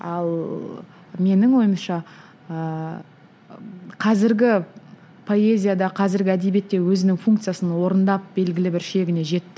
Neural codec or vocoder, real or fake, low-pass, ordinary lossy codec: none; real; none; none